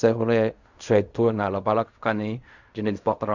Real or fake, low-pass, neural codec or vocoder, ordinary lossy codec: fake; 7.2 kHz; codec, 16 kHz in and 24 kHz out, 0.4 kbps, LongCat-Audio-Codec, fine tuned four codebook decoder; Opus, 64 kbps